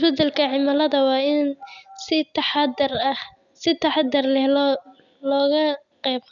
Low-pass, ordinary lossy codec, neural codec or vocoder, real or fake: 7.2 kHz; none; none; real